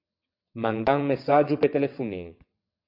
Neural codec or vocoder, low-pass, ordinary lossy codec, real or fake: vocoder, 22.05 kHz, 80 mel bands, WaveNeXt; 5.4 kHz; MP3, 48 kbps; fake